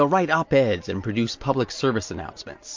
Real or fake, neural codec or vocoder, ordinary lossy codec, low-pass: real; none; MP3, 48 kbps; 7.2 kHz